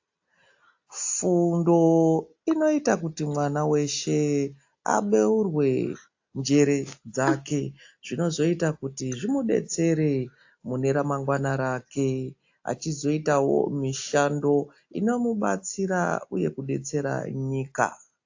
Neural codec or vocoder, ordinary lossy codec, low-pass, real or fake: none; AAC, 48 kbps; 7.2 kHz; real